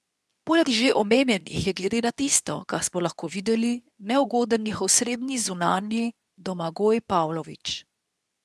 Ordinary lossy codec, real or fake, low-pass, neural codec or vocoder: none; fake; none; codec, 24 kHz, 0.9 kbps, WavTokenizer, medium speech release version 2